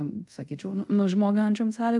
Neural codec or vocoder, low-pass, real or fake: codec, 24 kHz, 0.5 kbps, DualCodec; 10.8 kHz; fake